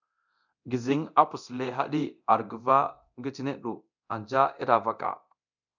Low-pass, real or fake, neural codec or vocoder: 7.2 kHz; fake; codec, 24 kHz, 0.9 kbps, DualCodec